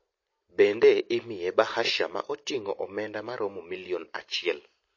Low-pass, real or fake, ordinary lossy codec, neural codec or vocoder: 7.2 kHz; real; MP3, 32 kbps; none